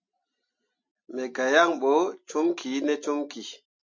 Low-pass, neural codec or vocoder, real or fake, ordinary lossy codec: 7.2 kHz; none; real; MP3, 48 kbps